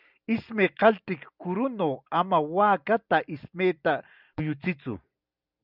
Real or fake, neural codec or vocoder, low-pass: real; none; 5.4 kHz